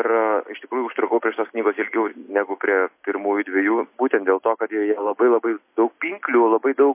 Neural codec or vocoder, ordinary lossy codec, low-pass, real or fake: none; MP3, 24 kbps; 3.6 kHz; real